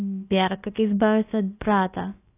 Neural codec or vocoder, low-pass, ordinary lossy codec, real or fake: codec, 16 kHz, about 1 kbps, DyCAST, with the encoder's durations; 3.6 kHz; AAC, 24 kbps; fake